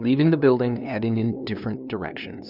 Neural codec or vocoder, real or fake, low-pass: codec, 16 kHz, 2 kbps, FunCodec, trained on LibriTTS, 25 frames a second; fake; 5.4 kHz